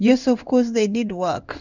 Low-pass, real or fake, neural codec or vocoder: 7.2 kHz; fake; codec, 16 kHz in and 24 kHz out, 1 kbps, XY-Tokenizer